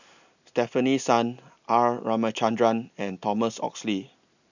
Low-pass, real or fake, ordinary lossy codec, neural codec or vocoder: 7.2 kHz; real; none; none